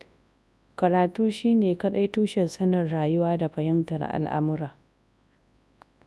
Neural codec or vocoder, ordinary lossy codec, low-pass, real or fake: codec, 24 kHz, 0.9 kbps, WavTokenizer, large speech release; none; none; fake